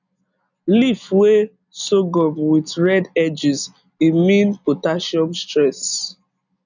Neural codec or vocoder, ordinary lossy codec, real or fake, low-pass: none; none; real; 7.2 kHz